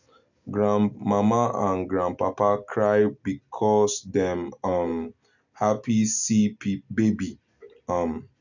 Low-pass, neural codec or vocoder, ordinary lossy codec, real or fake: 7.2 kHz; none; none; real